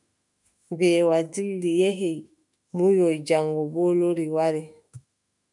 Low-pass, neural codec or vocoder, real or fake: 10.8 kHz; autoencoder, 48 kHz, 32 numbers a frame, DAC-VAE, trained on Japanese speech; fake